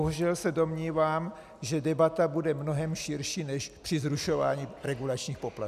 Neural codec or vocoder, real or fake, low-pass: none; real; 14.4 kHz